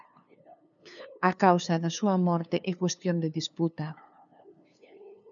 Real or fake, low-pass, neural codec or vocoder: fake; 7.2 kHz; codec, 16 kHz, 2 kbps, FunCodec, trained on LibriTTS, 25 frames a second